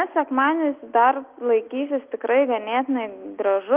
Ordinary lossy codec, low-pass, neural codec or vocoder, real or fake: Opus, 32 kbps; 3.6 kHz; none; real